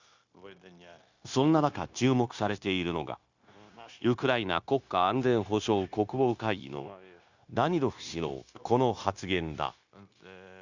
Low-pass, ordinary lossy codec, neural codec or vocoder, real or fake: 7.2 kHz; Opus, 64 kbps; codec, 16 kHz, 0.9 kbps, LongCat-Audio-Codec; fake